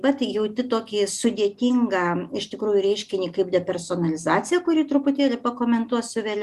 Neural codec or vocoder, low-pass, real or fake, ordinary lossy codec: none; 14.4 kHz; real; Opus, 64 kbps